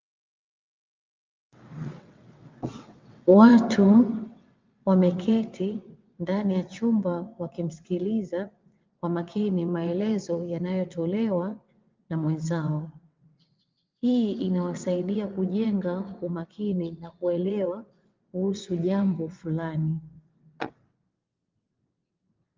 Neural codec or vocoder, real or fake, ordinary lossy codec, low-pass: vocoder, 22.05 kHz, 80 mel bands, WaveNeXt; fake; Opus, 24 kbps; 7.2 kHz